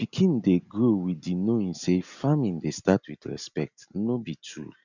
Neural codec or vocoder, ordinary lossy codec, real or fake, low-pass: none; none; real; 7.2 kHz